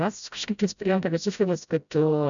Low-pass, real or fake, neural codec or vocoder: 7.2 kHz; fake; codec, 16 kHz, 0.5 kbps, FreqCodec, smaller model